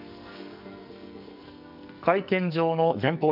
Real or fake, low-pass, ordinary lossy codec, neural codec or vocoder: fake; 5.4 kHz; none; codec, 44.1 kHz, 2.6 kbps, SNAC